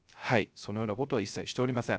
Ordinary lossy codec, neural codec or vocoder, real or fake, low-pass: none; codec, 16 kHz, 0.3 kbps, FocalCodec; fake; none